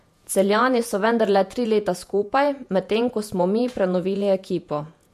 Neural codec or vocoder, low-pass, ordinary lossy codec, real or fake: vocoder, 48 kHz, 128 mel bands, Vocos; 14.4 kHz; MP3, 64 kbps; fake